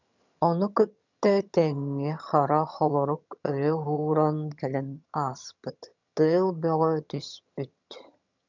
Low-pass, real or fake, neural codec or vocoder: 7.2 kHz; fake; vocoder, 22.05 kHz, 80 mel bands, HiFi-GAN